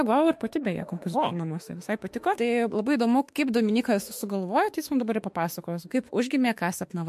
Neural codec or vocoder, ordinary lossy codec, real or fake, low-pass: autoencoder, 48 kHz, 32 numbers a frame, DAC-VAE, trained on Japanese speech; MP3, 64 kbps; fake; 14.4 kHz